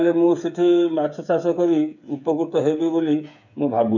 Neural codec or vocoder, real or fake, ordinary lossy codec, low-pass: codec, 16 kHz, 8 kbps, FreqCodec, smaller model; fake; none; 7.2 kHz